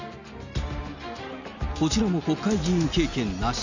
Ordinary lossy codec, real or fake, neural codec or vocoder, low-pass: none; real; none; 7.2 kHz